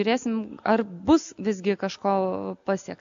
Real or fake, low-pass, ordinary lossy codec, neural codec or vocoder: real; 7.2 kHz; AAC, 64 kbps; none